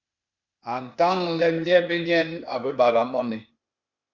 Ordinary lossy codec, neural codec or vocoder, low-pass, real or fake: Opus, 64 kbps; codec, 16 kHz, 0.8 kbps, ZipCodec; 7.2 kHz; fake